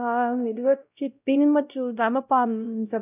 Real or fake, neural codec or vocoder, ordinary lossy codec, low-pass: fake; codec, 16 kHz, 0.5 kbps, X-Codec, WavLM features, trained on Multilingual LibriSpeech; none; 3.6 kHz